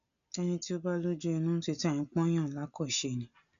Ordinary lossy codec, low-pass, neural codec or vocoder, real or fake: none; 7.2 kHz; none; real